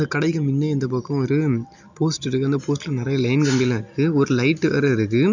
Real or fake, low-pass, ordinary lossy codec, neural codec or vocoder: real; 7.2 kHz; none; none